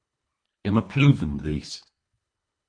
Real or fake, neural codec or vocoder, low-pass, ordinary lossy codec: fake; codec, 24 kHz, 1.5 kbps, HILCodec; 9.9 kHz; MP3, 48 kbps